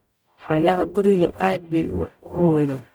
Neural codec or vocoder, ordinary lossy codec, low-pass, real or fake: codec, 44.1 kHz, 0.9 kbps, DAC; none; none; fake